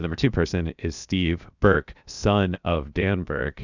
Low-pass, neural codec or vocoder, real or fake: 7.2 kHz; codec, 16 kHz, 0.7 kbps, FocalCodec; fake